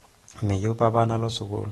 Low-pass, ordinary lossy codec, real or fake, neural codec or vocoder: 19.8 kHz; AAC, 32 kbps; fake; codec, 44.1 kHz, 7.8 kbps, DAC